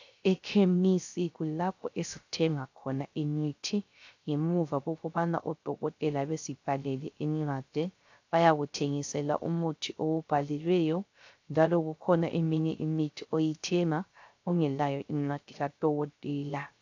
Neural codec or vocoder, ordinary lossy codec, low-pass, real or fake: codec, 16 kHz, 0.3 kbps, FocalCodec; AAC, 48 kbps; 7.2 kHz; fake